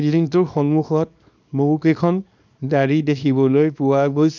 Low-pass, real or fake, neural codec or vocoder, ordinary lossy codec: 7.2 kHz; fake; codec, 24 kHz, 0.9 kbps, WavTokenizer, small release; none